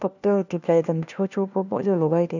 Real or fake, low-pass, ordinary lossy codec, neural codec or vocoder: fake; 7.2 kHz; none; codec, 16 kHz, 1 kbps, FunCodec, trained on LibriTTS, 50 frames a second